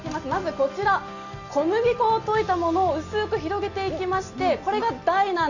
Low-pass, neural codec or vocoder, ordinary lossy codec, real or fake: 7.2 kHz; none; none; real